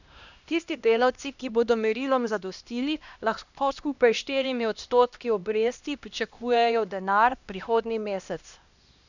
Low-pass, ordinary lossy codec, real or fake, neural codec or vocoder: 7.2 kHz; none; fake; codec, 16 kHz, 1 kbps, X-Codec, HuBERT features, trained on LibriSpeech